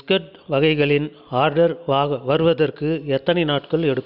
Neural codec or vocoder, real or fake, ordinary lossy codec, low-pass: none; real; none; 5.4 kHz